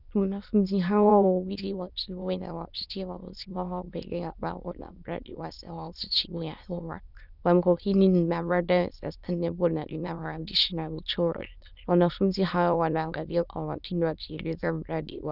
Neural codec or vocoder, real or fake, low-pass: autoencoder, 22.05 kHz, a latent of 192 numbers a frame, VITS, trained on many speakers; fake; 5.4 kHz